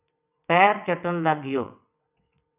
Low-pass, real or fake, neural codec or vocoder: 3.6 kHz; fake; vocoder, 22.05 kHz, 80 mel bands, WaveNeXt